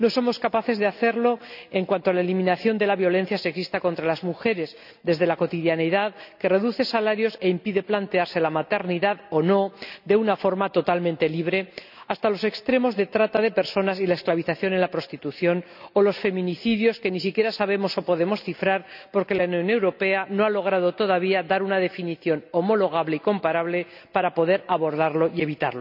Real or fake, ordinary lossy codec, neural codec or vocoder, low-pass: real; none; none; 5.4 kHz